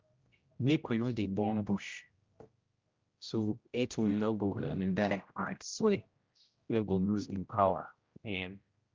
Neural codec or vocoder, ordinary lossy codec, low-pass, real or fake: codec, 16 kHz, 0.5 kbps, X-Codec, HuBERT features, trained on general audio; Opus, 24 kbps; 7.2 kHz; fake